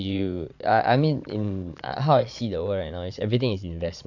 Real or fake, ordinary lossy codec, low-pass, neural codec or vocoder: fake; none; 7.2 kHz; vocoder, 22.05 kHz, 80 mel bands, Vocos